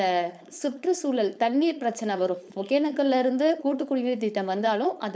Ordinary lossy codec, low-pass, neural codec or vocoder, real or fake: none; none; codec, 16 kHz, 4.8 kbps, FACodec; fake